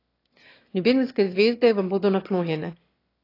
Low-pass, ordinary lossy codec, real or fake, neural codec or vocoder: 5.4 kHz; AAC, 32 kbps; fake; autoencoder, 22.05 kHz, a latent of 192 numbers a frame, VITS, trained on one speaker